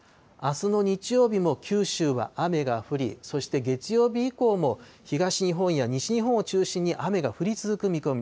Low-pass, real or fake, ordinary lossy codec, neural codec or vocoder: none; real; none; none